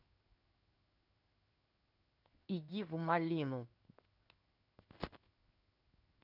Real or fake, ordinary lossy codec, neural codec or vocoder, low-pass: fake; none; codec, 16 kHz in and 24 kHz out, 1 kbps, XY-Tokenizer; 5.4 kHz